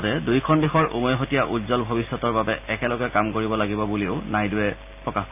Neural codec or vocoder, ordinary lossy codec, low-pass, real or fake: none; MP3, 32 kbps; 3.6 kHz; real